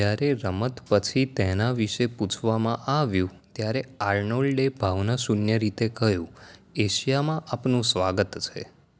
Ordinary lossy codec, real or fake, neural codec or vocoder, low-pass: none; real; none; none